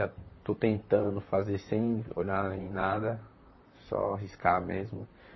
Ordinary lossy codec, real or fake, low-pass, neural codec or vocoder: MP3, 24 kbps; fake; 7.2 kHz; vocoder, 44.1 kHz, 128 mel bands, Pupu-Vocoder